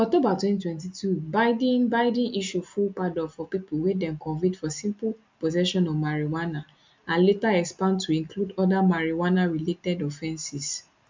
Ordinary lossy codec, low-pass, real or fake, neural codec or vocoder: MP3, 64 kbps; 7.2 kHz; real; none